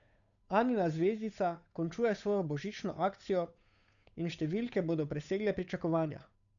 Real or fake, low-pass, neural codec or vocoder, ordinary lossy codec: fake; 7.2 kHz; codec, 16 kHz, 8 kbps, FunCodec, trained on Chinese and English, 25 frames a second; none